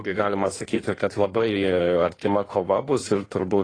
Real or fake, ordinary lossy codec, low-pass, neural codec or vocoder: fake; AAC, 32 kbps; 9.9 kHz; codec, 16 kHz in and 24 kHz out, 1.1 kbps, FireRedTTS-2 codec